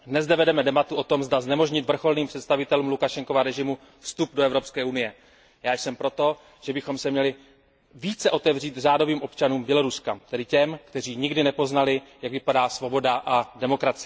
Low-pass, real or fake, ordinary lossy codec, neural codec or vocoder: none; real; none; none